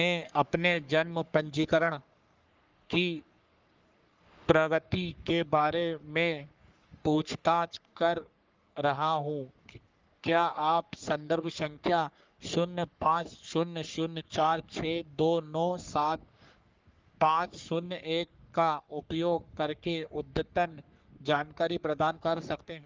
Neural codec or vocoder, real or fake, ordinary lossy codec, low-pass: codec, 44.1 kHz, 3.4 kbps, Pupu-Codec; fake; Opus, 32 kbps; 7.2 kHz